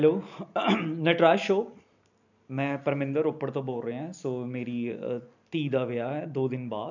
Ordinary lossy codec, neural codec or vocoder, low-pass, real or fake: none; none; 7.2 kHz; real